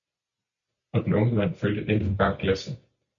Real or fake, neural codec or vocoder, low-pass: real; none; 7.2 kHz